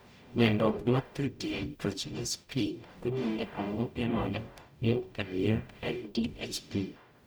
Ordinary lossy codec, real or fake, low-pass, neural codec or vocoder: none; fake; none; codec, 44.1 kHz, 0.9 kbps, DAC